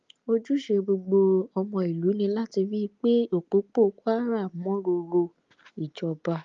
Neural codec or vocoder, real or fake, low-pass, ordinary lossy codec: none; real; 7.2 kHz; Opus, 32 kbps